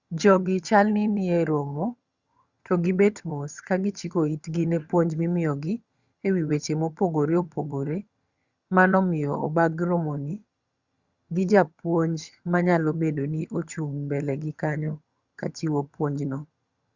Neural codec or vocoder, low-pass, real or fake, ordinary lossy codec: vocoder, 22.05 kHz, 80 mel bands, HiFi-GAN; 7.2 kHz; fake; Opus, 64 kbps